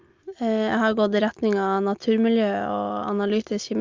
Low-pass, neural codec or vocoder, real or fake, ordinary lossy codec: 7.2 kHz; none; real; Opus, 32 kbps